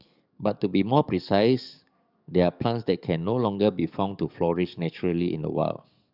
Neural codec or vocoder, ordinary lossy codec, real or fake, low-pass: codec, 44.1 kHz, 7.8 kbps, DAC; none; fake; 5.4 kHz